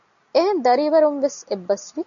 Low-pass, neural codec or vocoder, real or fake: 7.2 kHz; none; real